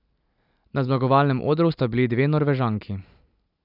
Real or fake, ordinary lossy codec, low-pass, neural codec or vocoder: real; none; 5.4 kHz; none